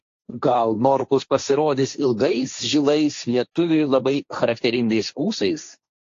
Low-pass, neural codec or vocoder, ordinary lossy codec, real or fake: 7.2 kHz; codec, 16 kHz, 1.1 kbps, Voila-Tokenizer; AAC, 48 kbps; fake